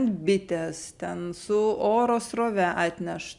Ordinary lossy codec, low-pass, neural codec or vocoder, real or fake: Opus, 64 kbps; 10.8 kHz; none; real